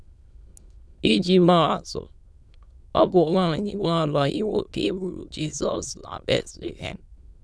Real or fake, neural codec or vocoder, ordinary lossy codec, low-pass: fake; autoencoder, 22.05 kHz, a latent of 192 numbers a frame, VITS, trained on many speakers; none; none